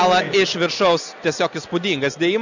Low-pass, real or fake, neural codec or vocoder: 7.2 kHz; real; none